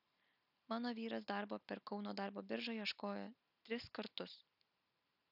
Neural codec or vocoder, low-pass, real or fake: none; 5.4 kHz; real